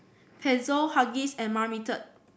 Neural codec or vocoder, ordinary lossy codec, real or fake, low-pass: none; none; real; none